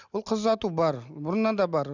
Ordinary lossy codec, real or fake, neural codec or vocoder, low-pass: none; real; none; 7.2 kHz